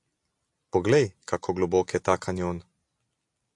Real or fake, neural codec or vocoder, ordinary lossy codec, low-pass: real; none; AAC, 64 kbps; 10.8 kHz